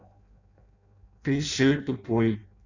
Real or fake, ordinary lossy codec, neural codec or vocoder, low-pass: fake; none; codec, 16 kHz in and 24 kHz out, 0.6 kbps, FireRedTTS-2 codec; 7.2 kHz